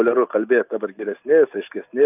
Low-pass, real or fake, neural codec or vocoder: 3.6 kHz; real; none